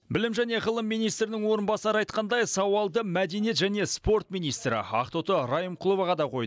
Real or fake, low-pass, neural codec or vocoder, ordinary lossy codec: real; none; none; none